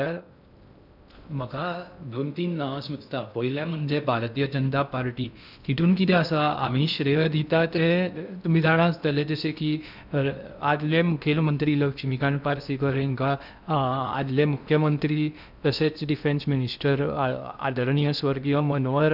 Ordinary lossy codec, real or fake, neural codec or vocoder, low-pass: none; fake; codec, 16 kHz in and 24 kHz out, 0.6 kbps, FocalCodec, streaming, 2048 codes; 5.4 kHz